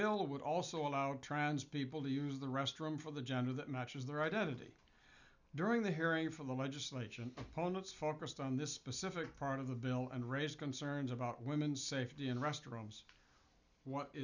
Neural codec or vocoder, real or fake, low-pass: none; real; 7.2 kHz